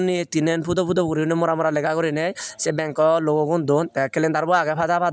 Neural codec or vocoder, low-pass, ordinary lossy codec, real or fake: none; none; none; real